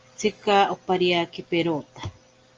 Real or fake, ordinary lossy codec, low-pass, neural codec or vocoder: real; Opus, 24 kbps; 7.2 kHz; none